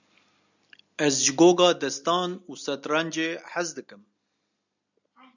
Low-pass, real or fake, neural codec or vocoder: 7.2 kHz; real; none